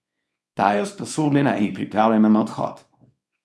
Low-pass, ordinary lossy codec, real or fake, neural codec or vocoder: none; none; fake; codec, 24 kHz, 0.9 kbps, WavTokenizer, small release